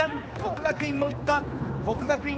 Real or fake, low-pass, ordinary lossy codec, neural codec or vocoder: fake; none; none; codec, 16 kHz, 2 kbps, X-Codec, HuBERT features, trained on general audio